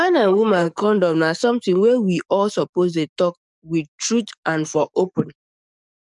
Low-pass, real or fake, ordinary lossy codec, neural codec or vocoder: 10.8 kHz; fake; none; codec, 44.1 kHz, 7.8 kbps, DAC